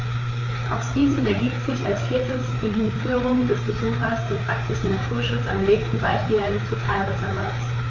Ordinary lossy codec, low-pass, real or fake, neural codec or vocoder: AAC, 48 kbps; 7.2 kHz; fake; codec, 16 kHz, 4 kbps, FreqCodec, larger model